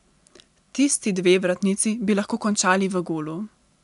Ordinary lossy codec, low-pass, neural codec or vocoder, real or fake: none; 10.8 kHz; none; real